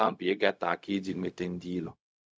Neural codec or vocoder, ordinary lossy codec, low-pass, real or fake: codec, 16 kHz, 0.4 kbps, LongCat-Audio-Codec; none; none; fake